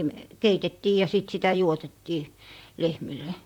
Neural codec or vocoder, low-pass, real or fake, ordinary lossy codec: vocoder, 44.1 kHz, 128 mel bands, Pupu-Vocoder; 19.8 kHz; fake; none